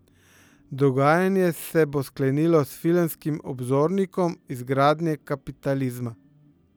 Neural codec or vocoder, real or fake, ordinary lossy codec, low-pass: none; real; none; none